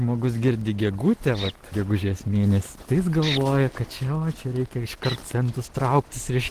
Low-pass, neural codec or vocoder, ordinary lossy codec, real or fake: 14.4 kHz; none; Opus, 16 kbps; real